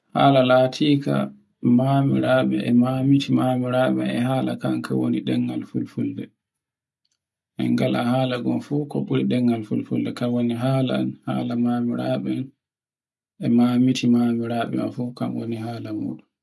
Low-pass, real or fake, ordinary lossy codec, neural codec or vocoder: none; real; none; none